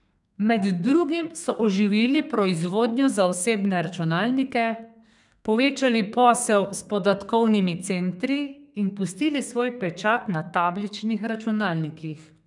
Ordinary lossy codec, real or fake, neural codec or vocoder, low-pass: none; fake; codec, 32 kHz, 1.9 kbps, SNAC; 10.8 kHz